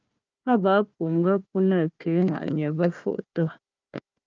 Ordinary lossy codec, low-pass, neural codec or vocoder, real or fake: Opus, 24 kbps; 7.2 kHz; codec, 16 kHz, 1 kbps, FunCodec, trained on Chinese and English, 50 frames a second; fake